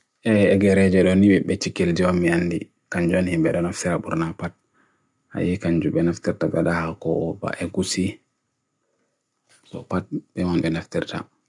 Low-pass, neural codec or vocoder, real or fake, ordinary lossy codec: 10.8 kHz; none; real; none